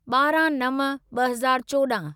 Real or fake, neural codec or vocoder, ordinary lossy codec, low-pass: real; none; none; none